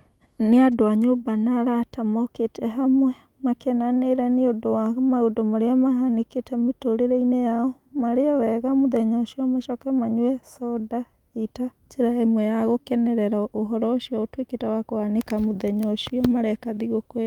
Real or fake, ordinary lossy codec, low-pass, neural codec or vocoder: fake; Opus, 32 kbps; 19.8 kHz; autoencoder, 48 kHz, 128 numbers a frame, DAC-VAE, trained on Japanese speech